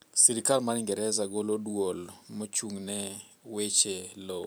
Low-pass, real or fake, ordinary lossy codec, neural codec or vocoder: none; fake; none; vocoder, 44.1 kHz, 128 mel bands every 512 samples, BigVGAN v2